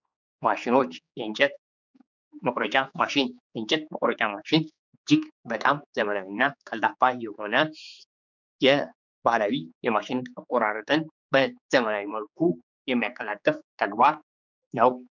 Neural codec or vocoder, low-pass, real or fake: codec, 16 kHz, 4 kbps, X-Codec, HuBERT features, trained on general audio; 7.2 kHz; fake